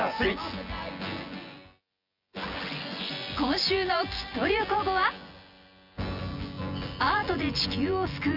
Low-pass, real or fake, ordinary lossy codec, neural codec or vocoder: 5.4 kHz; fake; Opus, 64 kbps; vocoder, 24 kHz, 100 mel bands, Vocos